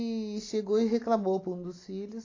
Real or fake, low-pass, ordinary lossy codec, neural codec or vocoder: real; 7.2 kHz; AAC, 32 kbps; none